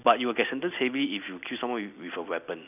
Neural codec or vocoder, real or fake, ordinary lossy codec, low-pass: none; real; none; 3.6 kHz